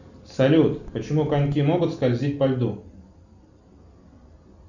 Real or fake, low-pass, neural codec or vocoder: real; 7.2 kHz; none